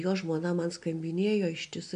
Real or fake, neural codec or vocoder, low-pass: real; none; 9.9 kHz